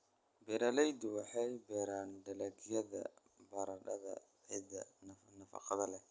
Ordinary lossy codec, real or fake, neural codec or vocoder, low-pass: none; real; none; none